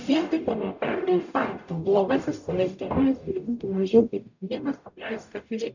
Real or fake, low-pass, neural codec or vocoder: fake; 7.2 kHz; codec, 44.1 kHz, 0.9 kbps, DAC